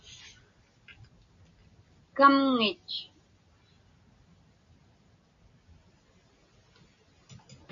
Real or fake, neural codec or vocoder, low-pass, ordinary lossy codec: real; none; 7.2 kHz; MP3, 64 kbps